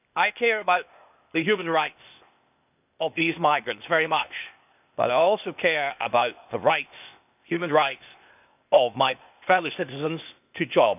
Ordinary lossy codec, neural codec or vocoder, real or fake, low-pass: none; codec, 16 kHz, 0.8 kbps, ZipCodec; fake; 3.6 kHz